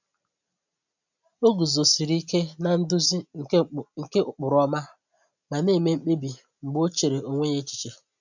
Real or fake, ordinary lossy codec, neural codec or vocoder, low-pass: real; none; none; 7.2 kHz